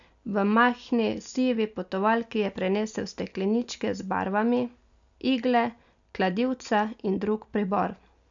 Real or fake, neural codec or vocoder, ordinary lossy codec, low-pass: real; none; MP3, 96 kbps; 7.2 kHz